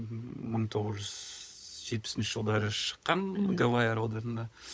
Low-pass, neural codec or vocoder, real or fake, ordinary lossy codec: none; codec, 16 kHz, 16 kbps, FunCodec, trained on LibriTTS, 50 frames a second; fake; none